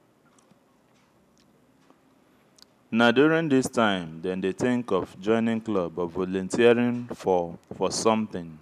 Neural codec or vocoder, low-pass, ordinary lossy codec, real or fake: none; 14.4 kHz; none; real